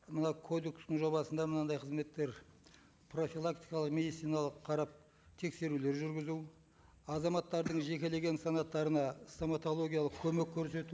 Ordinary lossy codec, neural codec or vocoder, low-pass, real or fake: none; none; none; real